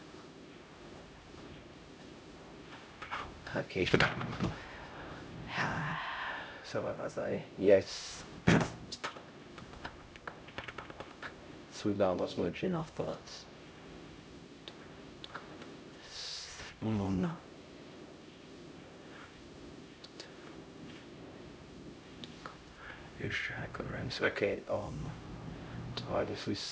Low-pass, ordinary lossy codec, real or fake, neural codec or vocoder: none; none; fake; codec, 16 kHz, 0.5 kbps, X-Codec, HuBERT features, trained on LibriSpeech